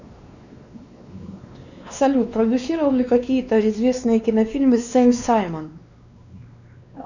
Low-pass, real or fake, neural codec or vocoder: 7.2 kHz; fake; codec, 16 kHz, 2 kbps, X-Codec, WavLM features, trained on Multilingual LibriSpeech